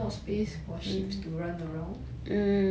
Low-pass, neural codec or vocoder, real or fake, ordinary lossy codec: none; none; real; none